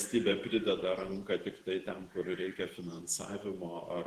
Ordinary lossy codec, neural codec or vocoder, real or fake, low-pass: Opus, 16 kbps; vocoder, 48 kHz, 128 mel bands, Vocos; fake; 14.4 kHz